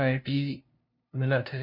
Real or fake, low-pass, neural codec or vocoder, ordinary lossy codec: fake; 5.4 kHz; codec, 16 kHz, 0.5 kbps, FunCodec, trained on LibriTTS, 25 frames a second; MP3, 48 kbps